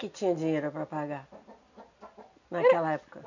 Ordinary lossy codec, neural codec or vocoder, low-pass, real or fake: none; none; 7.2 kHz; real